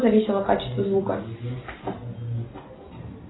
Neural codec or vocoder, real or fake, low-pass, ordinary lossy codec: none; real; 7.2 kHz; AAC, 16 kbps